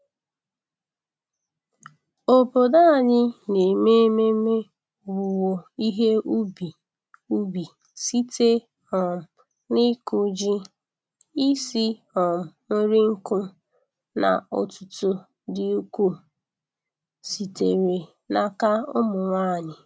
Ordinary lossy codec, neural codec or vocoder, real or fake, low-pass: none; none; real; none